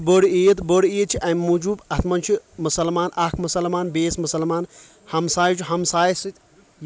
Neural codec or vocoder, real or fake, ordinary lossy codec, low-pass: none; real; none; none